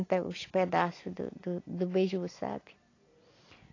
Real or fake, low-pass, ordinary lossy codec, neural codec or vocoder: real; 7.2 kHz; AAC, 32 kbps; none